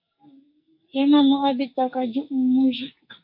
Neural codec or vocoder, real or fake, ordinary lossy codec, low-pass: codec, 44.1 kHz, 2.6 kbps, SNAC; fake; MP3, 32 kbps; 5.4 kHz